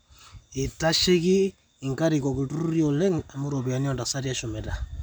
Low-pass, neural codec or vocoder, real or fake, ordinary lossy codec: none; none; real; none